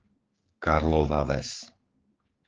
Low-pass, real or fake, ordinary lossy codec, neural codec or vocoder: 7.2 kHz; fake; Opus, 16 kbps; codec, 16 kHz, 4 kbps, X-Codec, HuBERT features, trained on general audio